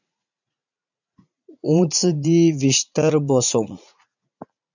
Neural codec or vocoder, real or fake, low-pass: vocoder, 44.1 kHz, 80 mel bands, Vocos; fake; 7.2 kHz